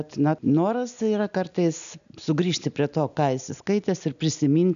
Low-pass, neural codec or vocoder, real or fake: 7.2 kHz; none; real